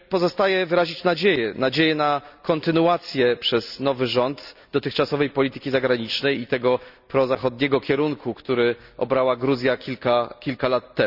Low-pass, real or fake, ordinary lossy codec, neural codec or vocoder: 5.4 kHz; real; none; none